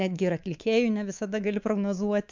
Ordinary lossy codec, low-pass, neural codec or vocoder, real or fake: MP3, 64 kbps; 7.2 kHz; codec, 24 kHz, 3.1 kbps, DualCodec; fake